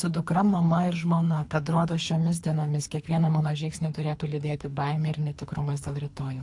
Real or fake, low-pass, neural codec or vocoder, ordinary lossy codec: fake; 10.8 kHz; codec, 24 kHz, 3 kbps, HILCodec; AAC, 64 kbps